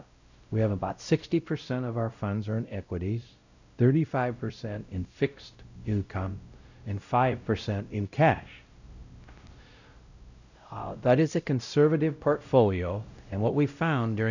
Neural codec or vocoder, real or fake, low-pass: codec, 16 kHz, 0.5 kbps, X-Codec, WavLM features, trained on Multilingual LibriSpeech; fake; 7.2 kHz